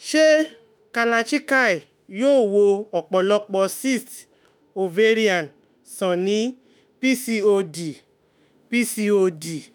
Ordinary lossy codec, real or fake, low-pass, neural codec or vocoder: none; fake; none; autoencoder, 48 kHz, 32 numbers a frame, DAC-VAE, trained on Japanese speech